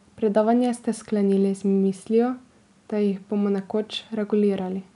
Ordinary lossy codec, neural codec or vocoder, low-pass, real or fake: none; none; 10.8 kHz; real